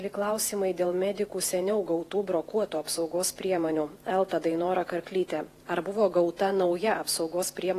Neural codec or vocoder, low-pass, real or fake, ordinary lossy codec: vocoder, 48 kHz, 128 mel bands, Vocos; 14.4 kHz; fake; AAC, 48 kbps